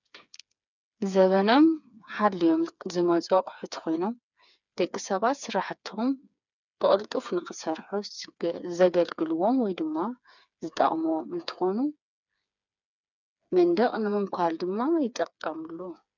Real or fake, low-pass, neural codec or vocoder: fake; 7.2 kHz; codec, 16 kHz, 4 kbps, FreqCodec, smaller model